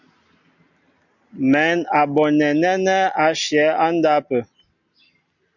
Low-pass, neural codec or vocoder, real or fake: 7.2 kHz; none; real